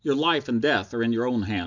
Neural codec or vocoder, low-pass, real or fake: none; 7.2 kHz; real